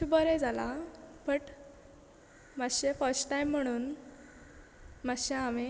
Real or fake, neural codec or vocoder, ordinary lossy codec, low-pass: real; none; none; none